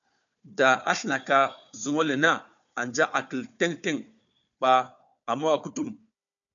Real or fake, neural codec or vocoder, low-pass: fake; codec, 16 kHz, 4 kbps, FunCodec, trained on Chinese and English, 50 frames a second; 7.2 kHz